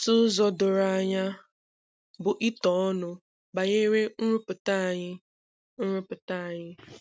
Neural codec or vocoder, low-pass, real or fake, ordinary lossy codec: none; none; real; none